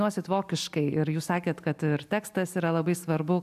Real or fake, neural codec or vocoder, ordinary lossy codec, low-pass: real; none; AAC, 96 kbps; 14.4 kHz